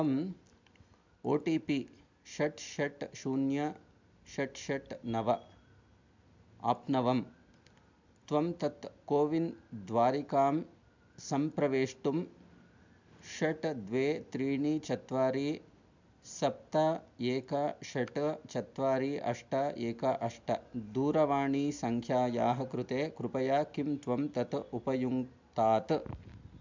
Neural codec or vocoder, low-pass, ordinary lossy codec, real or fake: none; 7.2 kHz; MP3, 64 kbps; real